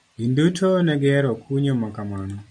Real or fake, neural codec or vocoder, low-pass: fake; vocoder, 44.1 kHz, 128 mel bands every 256 samples, BigVGAN v2; 9.9 kHz